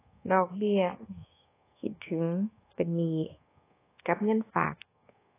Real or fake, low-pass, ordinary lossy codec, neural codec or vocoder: fake; 3.6 kHz; AAC, 16 kbps; codec, 24 kHz, 1.2 kbps, DualCodec